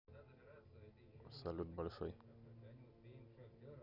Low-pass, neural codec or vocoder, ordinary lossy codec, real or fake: 5.4 kHz; none; none; real